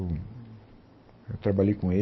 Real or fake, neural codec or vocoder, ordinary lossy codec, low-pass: real; none; MP3, 24 kbps; 7.2 kHz